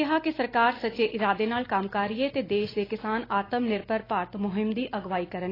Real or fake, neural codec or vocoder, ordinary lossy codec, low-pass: real; none; AAC, 24 kbps; 5.4 kHz